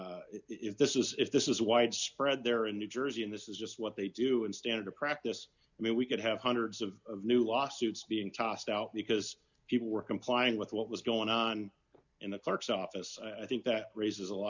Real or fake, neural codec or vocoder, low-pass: real; none; 7.2 kHz